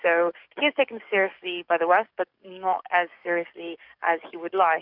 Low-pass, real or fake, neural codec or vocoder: 5.4 kHz; fake; codec, 44.1 kHz, 7.8 kbps, DAC